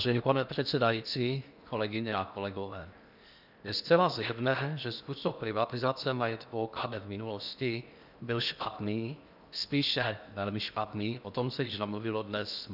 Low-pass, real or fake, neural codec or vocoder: 5.4 kHz; fake; codec, 16 kHz in and 24 kHz out, 0.8 kbps, FocalCodec, streaming, 65536 codes